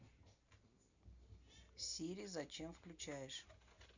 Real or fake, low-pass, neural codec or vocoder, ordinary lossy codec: real; 7.2 kHz; none; none